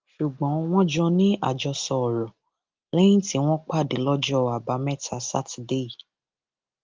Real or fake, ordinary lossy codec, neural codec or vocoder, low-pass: real; Opus, 32 kbps; none; 7.2 kHz